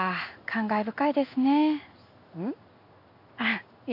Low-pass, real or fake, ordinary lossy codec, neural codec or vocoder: 5.4 kHz; fake; none; codec, 16 kHz in and 24 kHz out, 1 kbps, XY-Tokenizer